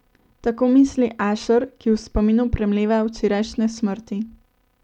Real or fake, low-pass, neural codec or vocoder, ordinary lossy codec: real; 19.8 kHz; none; none